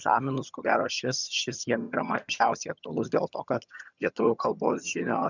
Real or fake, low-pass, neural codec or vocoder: fake; 7.2 kHz; vocoder, 22.05 kHz, 80 mel bands, HiFi-GAN